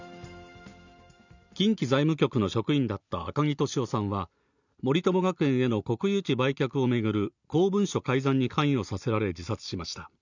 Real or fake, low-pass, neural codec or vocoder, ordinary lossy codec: real; 7.2 kHz; none; none